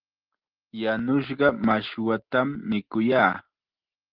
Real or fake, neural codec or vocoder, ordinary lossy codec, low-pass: real; none; Opus, 16 kbps; 5.4 kHz